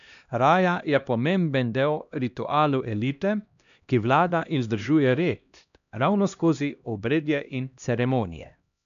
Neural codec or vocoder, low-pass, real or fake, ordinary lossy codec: codec, 16 kHz, 1 kbps, X-Codec, HuBERT features, trained on LibriSpeech; 7.2 kHz; fake; none